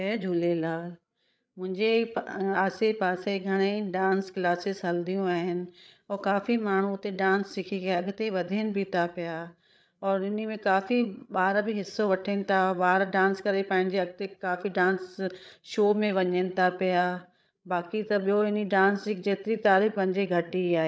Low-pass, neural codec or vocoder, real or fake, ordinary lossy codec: none; codec, 16 kHz, 8 kbps, FreqCodec, larger model; fake; none